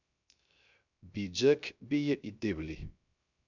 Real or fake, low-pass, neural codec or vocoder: fake; 7.2 kHz; codec, 16 kHz, 0.3 kbps, FocalCodec